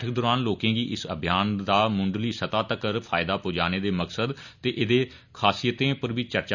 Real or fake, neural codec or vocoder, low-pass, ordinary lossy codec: real; none; 7.2 kHz; none